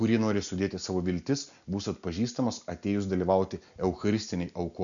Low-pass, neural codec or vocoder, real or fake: 7.2 kHz; none; real